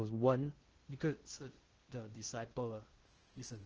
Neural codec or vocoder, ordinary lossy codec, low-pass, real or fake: codec, 16 kHz in and 24 kHz out, 0.6 kbps, FocalCodec, streaming, 2048 codes; Opus, 16 kbps; 7.2 kHz; fake